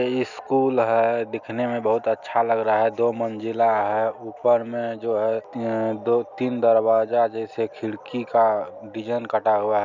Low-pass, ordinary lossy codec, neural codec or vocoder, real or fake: 7.2 kHz; none; none; real